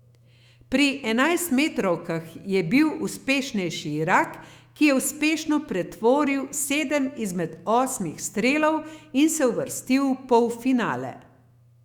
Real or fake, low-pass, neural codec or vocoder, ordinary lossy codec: fake; 19.8 kHz; autoencoder, 48 kHz, 128 numbers a frame, DAC-VAE, trained on Japanese speech; Opus, 64 kbps